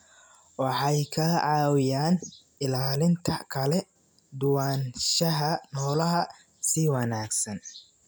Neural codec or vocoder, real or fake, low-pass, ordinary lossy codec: none; real; none; none